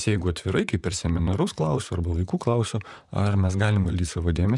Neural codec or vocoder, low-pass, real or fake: vocoder, 44.1 kHz, 128 mel bands, Pupu-Vocoder; 10.8 kHz; fake